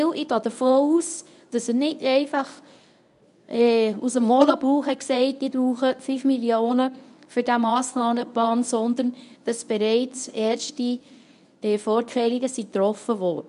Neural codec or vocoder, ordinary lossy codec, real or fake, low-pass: codec, 24 kHz, 0.9 kbps, WavTokenizer, medium speech release version 1; none; fake; 10.8 kHz